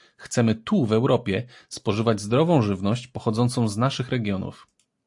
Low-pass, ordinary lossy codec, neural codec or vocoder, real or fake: 10.8 kHz; AAC, 64 kbps; none; real